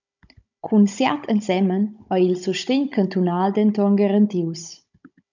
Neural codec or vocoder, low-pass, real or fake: codec, 16 kHz, 16 kbps, FunCodec, trained on Chinese and English, 50 frames a second; 7.2 kHz; fake